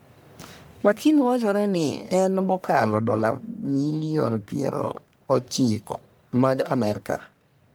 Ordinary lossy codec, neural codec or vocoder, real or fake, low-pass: none; codec, 44.1 kHz, 1.7 kbps, Pupu-Codec; fake; none